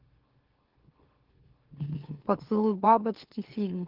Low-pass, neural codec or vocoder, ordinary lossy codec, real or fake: 5.4 kHz; autoencoder, 44.1 kHz, a latent of 192 numbers a frame, MeloTTS; Opus, 16 kbps; fake